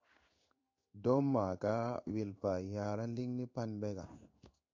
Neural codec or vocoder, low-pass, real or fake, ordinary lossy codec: codec, 16 kHz in and 24 kHz out, 1 kbps, XY-Tokenizer; 7.2 kHz; fake; AAC, 48 kbps